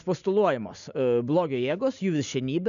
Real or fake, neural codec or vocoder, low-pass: real; none; 7.2 kHz